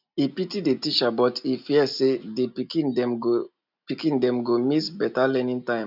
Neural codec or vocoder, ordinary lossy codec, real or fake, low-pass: none; none; real; 5.4 kHz